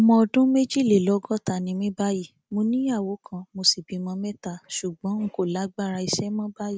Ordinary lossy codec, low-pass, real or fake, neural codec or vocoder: none; none; real; none